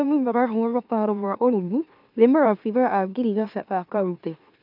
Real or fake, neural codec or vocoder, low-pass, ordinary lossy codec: fake; autoencoder, 44.1 kHz, a latent of 192 numbers a frame, MeloTTS; 5.4 kHz; AAC, 48 kbps